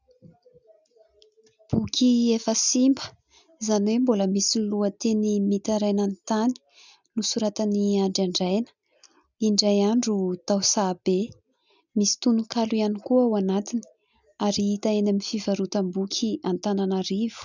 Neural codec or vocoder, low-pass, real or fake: none; 7.2 kHz; real